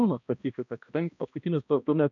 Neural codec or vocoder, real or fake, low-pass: codec, 16 kHz, 1 kbps, X-Codec, HuBERT features, trained on balanced general audio; fake; 7.2 kHz